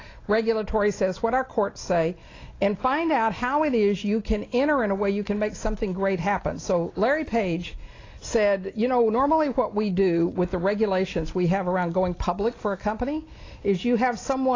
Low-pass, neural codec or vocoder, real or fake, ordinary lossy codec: 7.2 kHz; none; real; AAC, 32 kbps